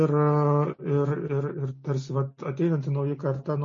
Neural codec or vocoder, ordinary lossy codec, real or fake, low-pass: none; MP3, 32 kbps; real; 7.2 kHz